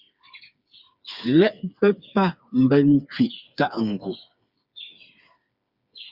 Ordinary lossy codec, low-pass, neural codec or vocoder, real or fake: Opus, 64 kbps; 5.4 kHz; codec, 16 kHz, 4 kbps, FreqCodec, smaller model; fake